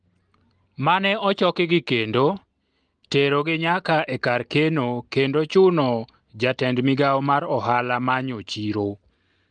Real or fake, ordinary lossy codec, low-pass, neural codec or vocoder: real; Opus, 24 kbps; 9.9 kHz; none